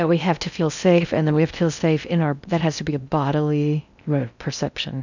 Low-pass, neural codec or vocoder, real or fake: 7.2 kHz; codec, 16 kHz in and 24 kHz out, 0.6 kbps, FocalCodec, streaming, 4096 codes; fake